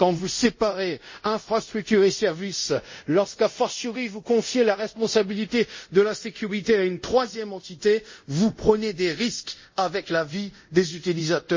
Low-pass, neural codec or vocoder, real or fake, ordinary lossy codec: 7.2 kHz; codec, 24 kHz, 0.5 kbps, DualCodec; fake; MP3, 32 kbps